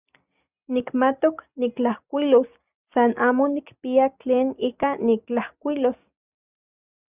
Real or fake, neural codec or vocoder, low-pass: real; none; 3.6 kHz